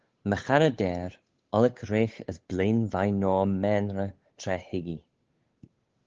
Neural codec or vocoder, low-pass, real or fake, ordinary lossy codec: codec, 16 kHz, 8 kbps, FunCodec, trained on Chinese and English, 25 frames a second; 7.2 kHz; fake; Opus, 16 kbps